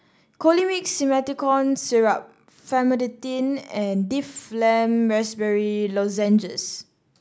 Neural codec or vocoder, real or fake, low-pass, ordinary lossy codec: none; real; none; none